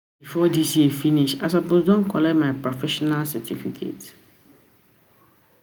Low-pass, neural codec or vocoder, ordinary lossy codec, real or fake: none; none; none; real